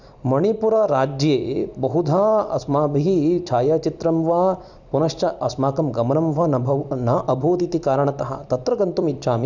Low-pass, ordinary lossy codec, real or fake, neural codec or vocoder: 7.2 kHz; none; fake; vocoder, 44.1 kHz, 128 mel bands every 256 samples, BigVGAN v2